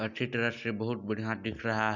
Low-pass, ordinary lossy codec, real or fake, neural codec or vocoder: 7.2 kHz; none; real; none